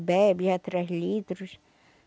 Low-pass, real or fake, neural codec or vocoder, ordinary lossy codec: none; real; none; none